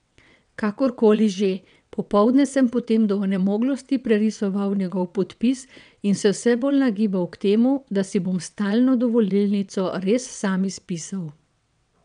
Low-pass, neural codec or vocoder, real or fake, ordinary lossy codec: 9.9 kHz; vocoder, 22.05 kHz, 80 mel bands, WaveNeXt; fake; none